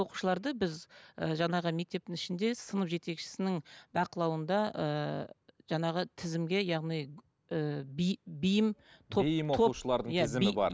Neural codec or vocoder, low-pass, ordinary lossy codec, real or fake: none; none; none; real